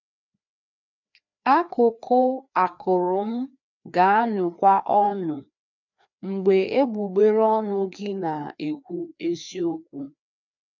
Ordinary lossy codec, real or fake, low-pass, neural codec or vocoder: none; fake; 7.2 kHz; codec, 16 kHz, 2 kbps, FreqCodec, larger model